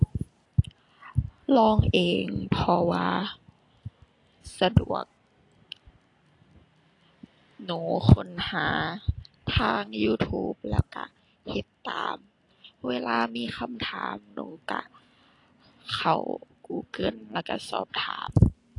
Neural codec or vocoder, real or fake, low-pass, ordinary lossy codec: none; real; 10.8 kHz; AAC, 48 kbps